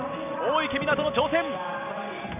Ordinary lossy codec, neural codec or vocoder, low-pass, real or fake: none; none; 3.6 kHz; real